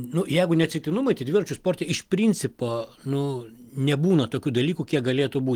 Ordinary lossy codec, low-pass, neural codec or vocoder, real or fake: Opus, 24 kbps; 19.8 kHz; none; real